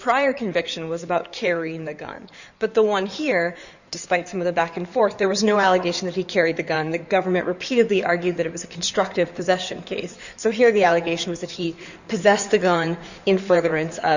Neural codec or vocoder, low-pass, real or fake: codec, 16 kHz in and 24 kHz out, 2.2 kbps, FireRedTTS-2 codec; 7.2 kHz; fake